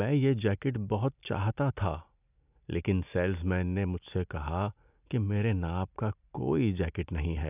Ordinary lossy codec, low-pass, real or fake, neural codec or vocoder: none; 3.6 kHz; real; none